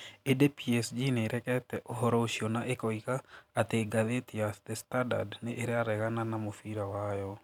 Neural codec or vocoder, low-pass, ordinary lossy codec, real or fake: vocoder, 44.1 kHz, 128 mel bands every 512 samples, BigVGAN v2; 19.8 kHz; none; fake